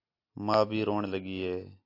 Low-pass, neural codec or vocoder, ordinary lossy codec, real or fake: 5.4 kHz; none; AAC, 32 kbps; real